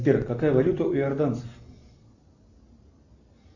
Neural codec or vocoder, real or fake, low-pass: none; real; 7.2 kHz